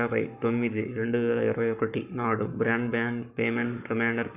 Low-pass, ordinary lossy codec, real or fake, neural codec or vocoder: 3.6 kHz; none; fake; codec, 44.1 kHz, 7.8 kbps, Pupu-Codec